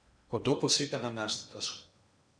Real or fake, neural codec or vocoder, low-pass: fake; codec, 16 kHz in and 24 kHz out, 0.8 kbps, FocalCodec, streaming, 65536 codes; 9.9 kHz